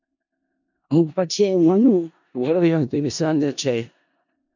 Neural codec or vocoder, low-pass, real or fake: codec, 16 kHz in and 24 kHz out, 0.4 kbps, LongCat-Audio-Codec, four codebook decoder; 7.2 kHz; fake